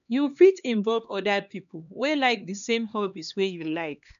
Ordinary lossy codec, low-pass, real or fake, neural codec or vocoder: none; 7.2 kHz; fake; codec, 16 kHz, 2 kbps, X-Codec, HuBERT features, trained on LibriSpeech